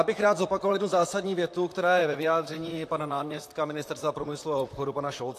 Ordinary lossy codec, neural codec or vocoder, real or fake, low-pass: AAC, 64 kbps; vocoder, 44.1 kHz, 128 mel bands, Pupu-Vocoder; fake; 14.4 kHz